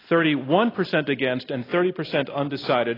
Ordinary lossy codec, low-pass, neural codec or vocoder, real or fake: AAC, 24 kbps; 5.4 kHz; none; real